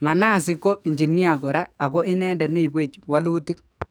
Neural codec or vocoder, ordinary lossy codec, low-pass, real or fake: codec, 44.1 kHz, 2.6 kbps, SNAC; none; none; fake